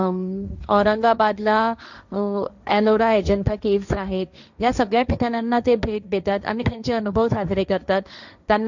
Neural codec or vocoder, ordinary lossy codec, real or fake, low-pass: codec, 16 kHz, 1.1 kbps, Voila-Tokenizer; none; fake; 7.2 kHz